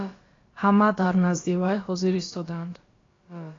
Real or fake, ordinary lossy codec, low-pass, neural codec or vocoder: fake; AAC, 32 kbps; 7.2 kHz; codec, 16 kHz, about 1 kbps, DyCAST, with the encoder's durations